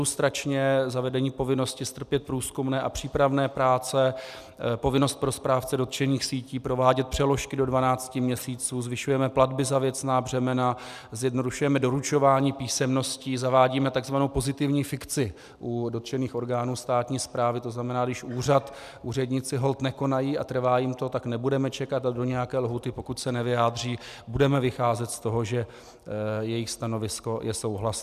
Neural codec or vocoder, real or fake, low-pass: none; real; 14.4 kHz